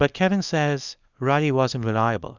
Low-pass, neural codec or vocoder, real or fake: 7.2 kHz; codec, 24 kHz, 0.9 kbps, WavTokenizer, small release; fake